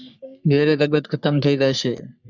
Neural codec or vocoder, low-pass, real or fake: codec, 44.1 kHz, 3.4 kbps, Pupu-Codec; 7.2 kHz; fake